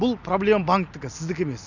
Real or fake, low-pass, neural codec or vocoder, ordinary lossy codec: real; 7.2 kHz; none; none